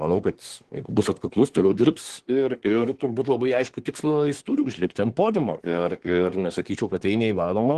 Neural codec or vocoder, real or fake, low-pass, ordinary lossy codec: codec, 24 kHz, 1 kbps, SNAC; fake; 10.8 kHz; Opus, 16 kbps